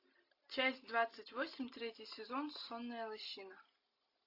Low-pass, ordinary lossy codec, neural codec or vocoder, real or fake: 5.4 kHz; AAC, 32 kbps; none; real